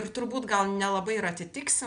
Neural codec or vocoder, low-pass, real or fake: none; 9.9 kHz; real